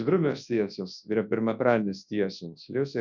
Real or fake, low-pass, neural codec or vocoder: fake; 7.2 kHz; codec, 24 kHz, 0.9 kbps, WavTokenizer, large speech release